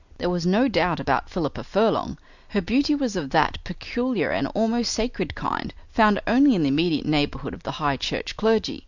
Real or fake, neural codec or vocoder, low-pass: real; none; 7.2 kHz